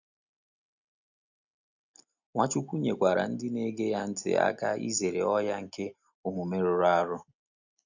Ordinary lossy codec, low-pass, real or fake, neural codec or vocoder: none; 7.2 kHz; real; none